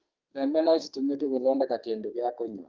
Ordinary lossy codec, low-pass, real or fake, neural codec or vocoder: Opus, 24 kbps; 7.2 kHz; fake; codec, 32 kHz, 1.9 kbps, SNAC